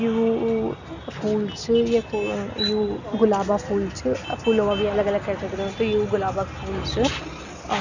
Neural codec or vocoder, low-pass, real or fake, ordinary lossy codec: none; 7.2 kHz; real; none